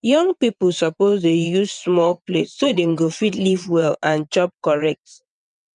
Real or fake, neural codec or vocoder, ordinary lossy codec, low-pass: fake; vocoder, 22.05 kHz, 80 mel bands, WaveNeXt; none; 9.9 kHz